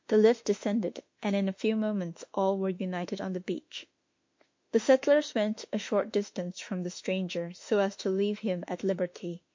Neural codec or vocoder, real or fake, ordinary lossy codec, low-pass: autoencoder, 48 kHz, 32 numbers a frame, DAC-VAE, trained on Japanese speech; fake; MP3, 48 kbps; 7.2 kHz